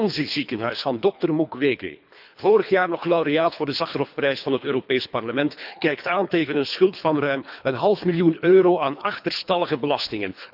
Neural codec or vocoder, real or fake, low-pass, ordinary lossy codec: codec, 24 kHz, 3 kbps, HILCodec; fake; 5.4 kHz; none